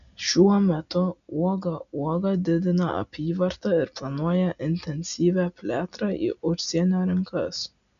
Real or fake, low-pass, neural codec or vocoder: real; 7.2 kHz; none